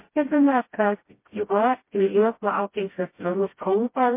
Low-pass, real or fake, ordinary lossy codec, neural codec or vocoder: 3.6 kHz; fake; MP3, 24 kbps; codec, 16 kHz, 0.5 kbps, FreqCodec, smaller model